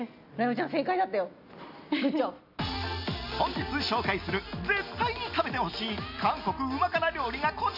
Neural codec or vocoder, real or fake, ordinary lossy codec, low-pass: none; real; none; 5.4 kHz